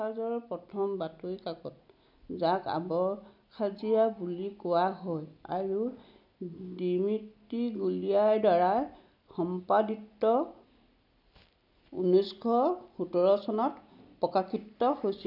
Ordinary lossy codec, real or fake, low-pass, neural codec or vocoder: none; real; 5.4 kHz; none